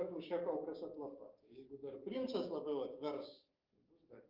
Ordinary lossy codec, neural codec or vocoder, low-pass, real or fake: Opus, 24 kbps; codec, 44.1 kHz, 7.8 kbps, DAC; 5.4 kHz; fake